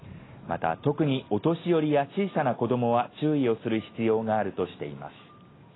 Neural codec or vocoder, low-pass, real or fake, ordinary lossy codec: none; 7.2 kHz; real; AAC, 16 kbps